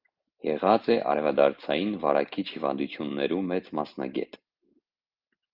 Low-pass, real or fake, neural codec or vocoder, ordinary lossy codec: 5.4 kHz; real; none; Opus, 24 kbps